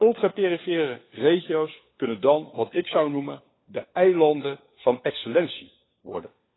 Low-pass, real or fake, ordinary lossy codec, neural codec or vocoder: 7.2 kHz; fake; AAC, 16 kbps; codec, 16 kHz, 4 kbps, FunCodec, trained on LibriTTS, 50 frames a second